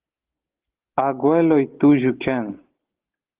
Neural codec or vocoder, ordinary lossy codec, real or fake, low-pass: none; Opus, 16 kbps; real; 3.6 kHz